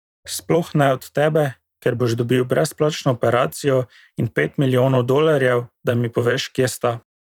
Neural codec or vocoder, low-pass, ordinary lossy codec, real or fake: vocoder, 44.1 kHz, 128 mel bands every 512 samples, BigVGAN v2; 19.8 kHz; none; fake